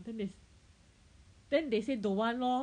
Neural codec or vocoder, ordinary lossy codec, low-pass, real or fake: none; none; 9.9 kHz; real